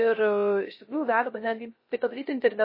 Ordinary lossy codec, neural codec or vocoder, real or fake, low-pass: MP3, 24 kbps; codec, 16 kHz, 0.3 kbps, FocalCodec; fake; 5.4 kHz